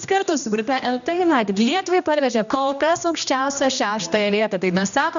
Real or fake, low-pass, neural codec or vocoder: fake; 7.2 kHz; codec, 16 kHz, 1 kbps, X-Codec, HuBERT features, trained on general audio